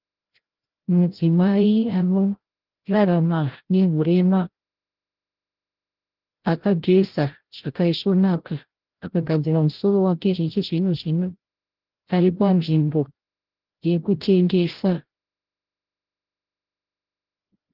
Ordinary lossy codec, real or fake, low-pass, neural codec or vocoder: Opus, 16 kbps; fake; 5.4 kHz; codec, 16 kHz, 0.5 kbps, FreqCodec, larger model